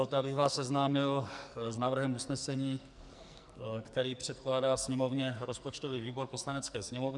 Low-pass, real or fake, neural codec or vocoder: 10.8 kHz; fake; codec, 44.1 kHz, 2.6 kbps, SNAC